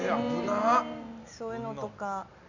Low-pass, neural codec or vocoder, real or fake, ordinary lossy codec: 7.2 kHz; none; real; none